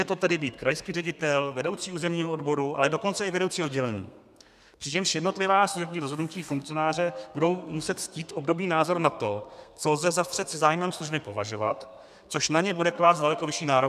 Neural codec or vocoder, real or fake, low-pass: codec, 32 kHz, 1.9 kbps, SNAC; fake; 14.4 kHz